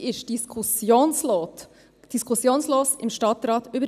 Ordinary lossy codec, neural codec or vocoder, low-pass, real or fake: none; none; 14.4 kHz; real